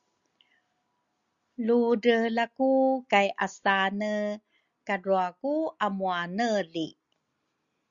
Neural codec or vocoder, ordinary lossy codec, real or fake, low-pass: none; Opus, 64 kbps; real; 7.2 kHz